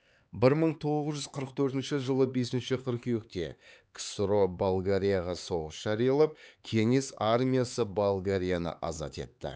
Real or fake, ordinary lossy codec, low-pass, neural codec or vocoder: fake; none; none; codec, 16 kHz, 4 kbps, X-Codec, HuBERT features, trained on LibriSpeech